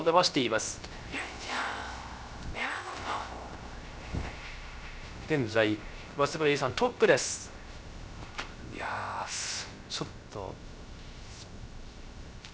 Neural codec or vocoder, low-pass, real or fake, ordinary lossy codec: codec, 16 kHz, 0.3 kbps, FocalCodec; none; fake; none